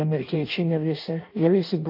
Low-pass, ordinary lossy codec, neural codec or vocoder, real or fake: 5.4 kHz; MP3, 32 kbps; codec, 16 kHz in and 24 kHz out, 0.6 kbps, FireRedTTS-2 codec; fake